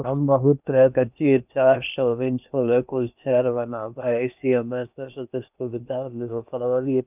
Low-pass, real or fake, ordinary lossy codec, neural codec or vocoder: 3.6 kHz; fake; none; codec, 16 kHz in and 24 kHz out, 0.8 kbps, FocalCodec, streaming, 65536 codes